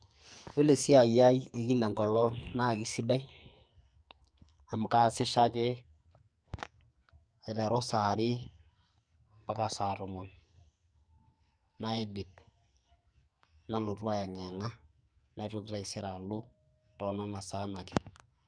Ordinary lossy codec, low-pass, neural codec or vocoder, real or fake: MP3, 96 kbps; 9.9 kHz; codec, 44.1 kHz, 2.6 kbps, SNAC; fake